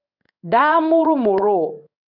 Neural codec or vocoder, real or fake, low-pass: codec, 16 kHz in and 24 kHz out, 1 kbps, XY-Tokenizer; fake; 5.4 kHz